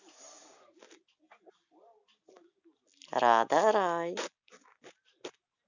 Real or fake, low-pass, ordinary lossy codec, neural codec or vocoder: real; 7.2 kHz; Opus, 64 kbps; none